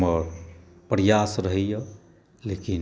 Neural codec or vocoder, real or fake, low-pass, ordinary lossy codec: none; real; none; none